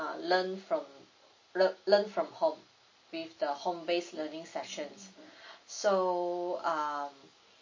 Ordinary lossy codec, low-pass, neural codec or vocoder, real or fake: MP3, 32 kbps; 7.2 kHz; none; real